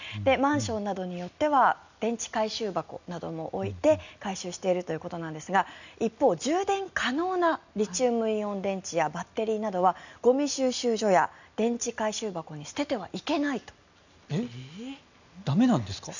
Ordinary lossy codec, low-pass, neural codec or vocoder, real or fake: none; 7.2 kHz; none; real